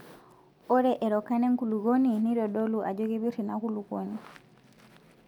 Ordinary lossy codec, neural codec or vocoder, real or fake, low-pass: none; none; real; 19.8 kHz